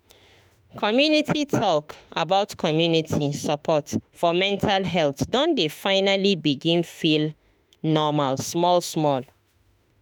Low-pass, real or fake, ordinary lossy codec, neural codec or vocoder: none; fake; none; autoencoder, 48 kHz, 32 numbers a frame, DAC-VAE, trained on Japanese speech